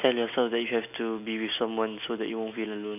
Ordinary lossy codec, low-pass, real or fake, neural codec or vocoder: none; 3.6 kHz; real; none